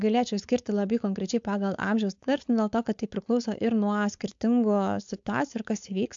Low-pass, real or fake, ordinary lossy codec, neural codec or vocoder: 7.2 kHz; fake; AAC, 64 kbps; codec, 16 kHz, 4.8 kbps, FACodec